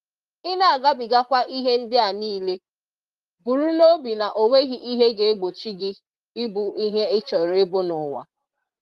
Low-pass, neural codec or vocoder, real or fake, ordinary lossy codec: 14.4 kHz; vocoder, 44.1 kHz, 128 mel bands, Pupu-Vocoder; fake; Opus, 24 kbps